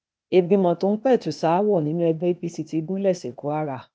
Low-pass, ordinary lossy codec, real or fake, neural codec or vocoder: none; none; fake; codec, 16 kHz, 0.8 kbps, ZipCodec